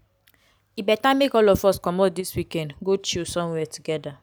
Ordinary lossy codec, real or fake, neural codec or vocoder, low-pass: none; real; none; none